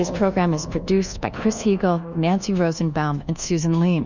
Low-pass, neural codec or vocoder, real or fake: 7.2 kHz; codec, 24 kHz, 1.2 kbps, DualCodec; fake